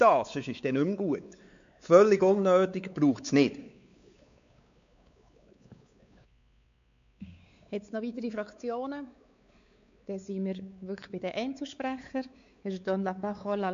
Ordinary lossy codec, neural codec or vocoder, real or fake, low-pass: MP3, 64 kbps; codec, 16 kHz, 4 kbps, X-Codec, WavLM features, trained on Multilingual LibriSpeech; fake; 7.2 kHz